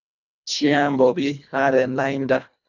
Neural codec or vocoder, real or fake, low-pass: codec, 24 kHz, 1.5 kbps, HILCodec; fake; 7.2 kHz